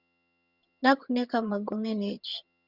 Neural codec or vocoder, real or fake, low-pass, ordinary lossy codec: vocoder, 22.05 kHz, 80 mel bands, HiFi-GAN; fake; 5.4 kHz; Opus, 64 kbps